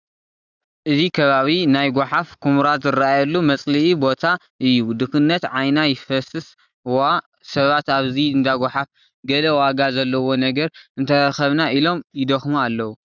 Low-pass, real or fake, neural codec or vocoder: 7.2 kHz; real; none